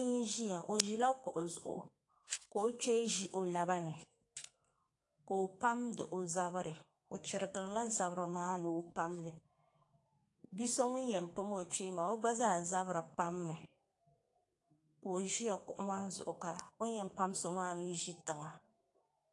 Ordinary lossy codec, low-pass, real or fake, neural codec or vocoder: AAC, 48 kbps; 10.8 kHz; fake; codec, 32 kHz, 1.9 kbps, SNAC